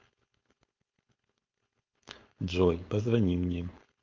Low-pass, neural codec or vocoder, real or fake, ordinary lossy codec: 7.2 kHz; codec, 16 kHz, 4.8 kbps, FACodec; fake; Opus, 24 kbps